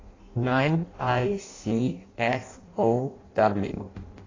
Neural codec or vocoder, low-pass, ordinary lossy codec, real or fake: codec, 16 kHz in and 24 kHz out, 0.6 kbps, FireRedTTS-2 codec; 7.2 kHz; AAC, 32 kbps; fake